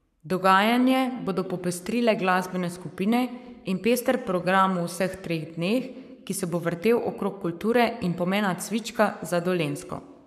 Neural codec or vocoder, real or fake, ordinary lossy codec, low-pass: codec, 44.1 kHz, 7.8 kbps, Pupu-Codec; fake; none; 14.4 kHz